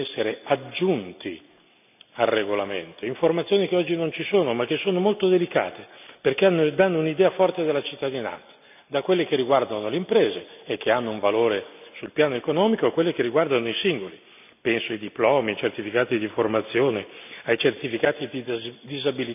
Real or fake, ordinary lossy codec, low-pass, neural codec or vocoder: real; none; 3.6 kHz; none